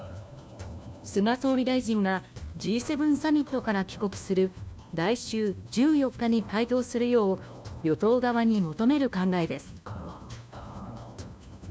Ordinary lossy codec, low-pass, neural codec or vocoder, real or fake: none; none; codec, 16 kHz, 1 kbps, FunCodec, trained on LibriTTS, 50 frames a second; fake